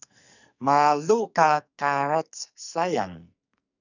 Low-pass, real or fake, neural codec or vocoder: 7.2 kHz; fake; codec, 44.1 kHz, 2.6 kbps, SNAC